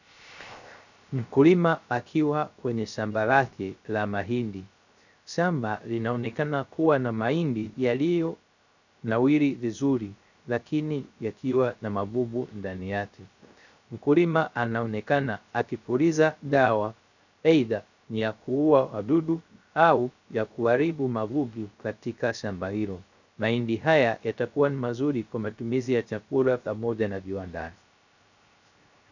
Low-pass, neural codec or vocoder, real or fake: 7.2 kHz; codec, 16 kHz, 0.3 kbps, FocalCodec; fake